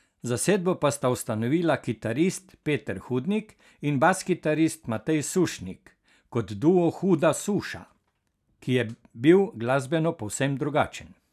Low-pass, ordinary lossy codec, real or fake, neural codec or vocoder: 14.4 kHz; none; real; none